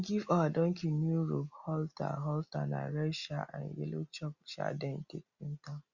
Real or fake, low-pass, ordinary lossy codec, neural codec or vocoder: real; 7.2 kHz; Opus, 64 kbps; none